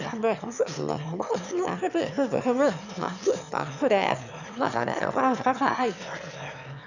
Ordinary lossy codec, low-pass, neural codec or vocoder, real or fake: none; 7.2 kHz; autoencoder, 22.05 kHz, a latent of 192 numbers a frame, VITS, trained on one speaker; fake